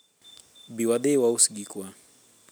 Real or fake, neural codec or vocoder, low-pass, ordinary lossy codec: real; none; none; none